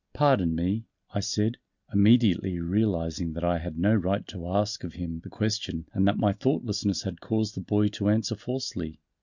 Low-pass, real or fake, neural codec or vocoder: 7.2 kHz; real; none